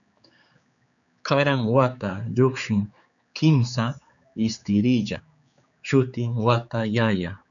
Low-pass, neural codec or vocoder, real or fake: 7.2 kHz; codec, 16 kHz, 4 kbps, X-Codec, HuBERT features, trained on general audio; fake